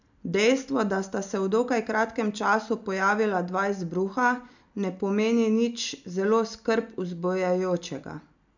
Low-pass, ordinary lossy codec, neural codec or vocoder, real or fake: 7.2 kHz; none; none; real